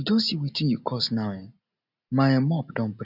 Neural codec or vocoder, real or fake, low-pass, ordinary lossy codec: none; real; 5.4 kHz; none